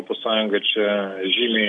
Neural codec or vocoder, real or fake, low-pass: none; real; 9.9 kHz